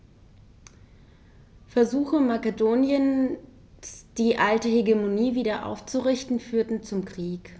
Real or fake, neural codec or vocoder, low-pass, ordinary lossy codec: real; none; none; none